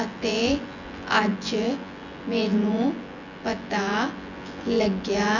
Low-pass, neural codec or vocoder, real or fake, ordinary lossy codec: 7.2 kHz; vocoder, 24 kHz, 100 mel bands, Vocos; fake; none